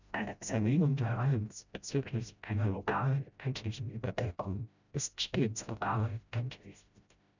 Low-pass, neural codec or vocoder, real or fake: 7.2 kHz; codec, 16 kHz, 0.5 kbps, FreqCodec, smaller model; fake